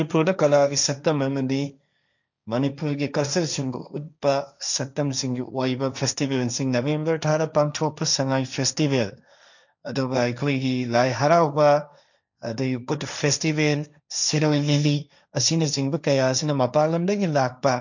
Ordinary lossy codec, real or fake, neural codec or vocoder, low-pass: none; fake; codec, 16 kHz, 1.1 kbps, Voila-Tokenizer; 7.2 kHz